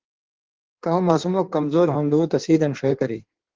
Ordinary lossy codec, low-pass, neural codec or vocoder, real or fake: Opus, 16 kbps; 7.2 kHz; codec, 16 kHz in and 24 kHz out, 1.1 kbps, FireRedTTS-2 codec; fake